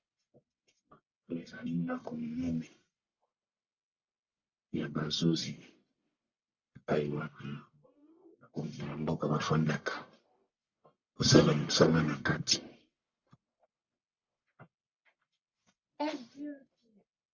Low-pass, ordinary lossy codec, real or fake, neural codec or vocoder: 7.2 kHz; Opus, 64 kbps; fake; codec, 44.1 kHz, 1.7 kbps, Pupu-Codec